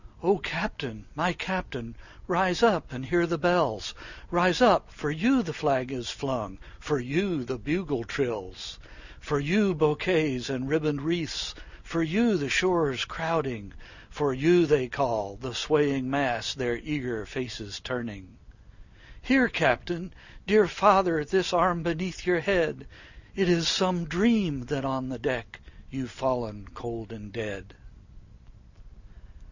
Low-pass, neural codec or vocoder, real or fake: 7.2 kHz; none; real